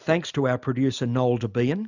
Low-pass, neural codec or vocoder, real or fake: 7.2 kHz; none; real